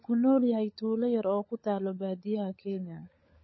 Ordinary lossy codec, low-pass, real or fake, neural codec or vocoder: MP3, 24 kbps; 7.2 kHz; fake; codec, 16 kHz, 16 kbps, FunCodec, trained on LibriTTS, 50 frames a second